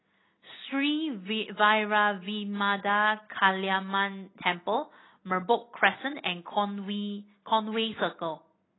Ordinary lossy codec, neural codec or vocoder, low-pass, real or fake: AAC, 16 kbps; none; 7.2 kHz; real